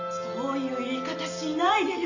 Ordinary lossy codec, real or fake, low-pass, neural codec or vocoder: none; real; 7.2 kHz; none